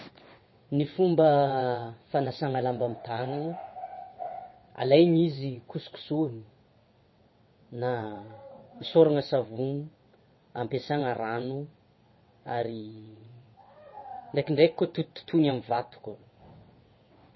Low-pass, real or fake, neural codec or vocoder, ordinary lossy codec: 7.2 kHz; fake; vocoder, 22.05 kHz, 80 mel bands, WaveNeXt; MP3, 24 kbps